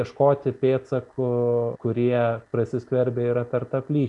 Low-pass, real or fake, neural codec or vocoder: 10.8 kHz; real; none